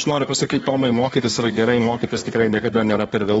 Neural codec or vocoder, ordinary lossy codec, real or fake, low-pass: codec, 24 kHz, 1 kbps, SNAC; AAC, 24 kbps; fake; 10.8 kHz